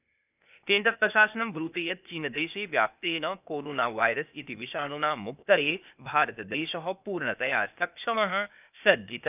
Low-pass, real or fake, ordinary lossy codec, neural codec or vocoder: 3.6 kHz; fake; none; codec, 16 kHz, 0.8 kbps, ZipCodec